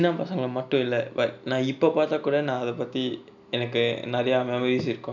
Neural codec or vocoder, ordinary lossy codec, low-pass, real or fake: none; none; 7.2 kHz; real